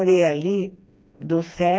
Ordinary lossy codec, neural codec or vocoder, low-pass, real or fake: none; codec, 16 kHz, 2 kbps, FreqCodec, smaller model; none; fake